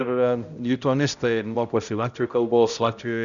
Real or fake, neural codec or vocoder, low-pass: fake; codec, 16 kHz, 0.5 kbps, X-Codec, HuBERT features, trained on balanced general audio; 7.2 kHz